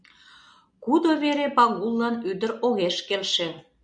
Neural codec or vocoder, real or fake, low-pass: none; real; 9.9 kHz